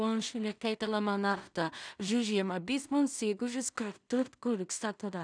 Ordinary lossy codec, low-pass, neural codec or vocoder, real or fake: none; 9.9 kHz; codec, 16 kHz in and 24 kHz out, 0.4 kbps, LongCat-Audio-Codec, two codebook decoder; fake